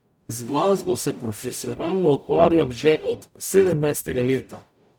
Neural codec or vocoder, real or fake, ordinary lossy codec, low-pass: codec, 44.1 kHz, 0.9 kbps, DAC; fake; none; none